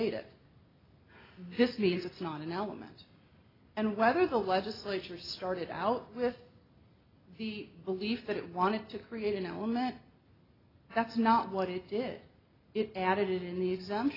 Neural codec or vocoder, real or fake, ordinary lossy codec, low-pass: none; real; AAC, 24 kbps; 5.4 kHz